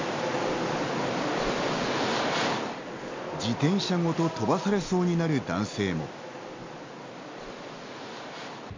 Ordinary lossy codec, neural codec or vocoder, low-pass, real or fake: MP3, 64 kbps; none; 7.2 kHz; real